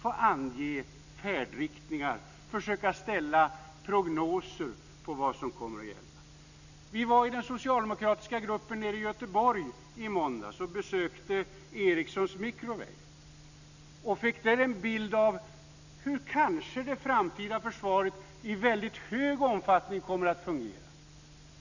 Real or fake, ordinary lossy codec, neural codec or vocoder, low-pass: real; none; none; 7.2 kHz